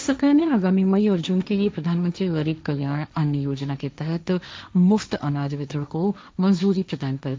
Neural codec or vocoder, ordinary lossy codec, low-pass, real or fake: codec, 16 kHz, 1.1 kbps, Voila-Tokenizer; none; none; fake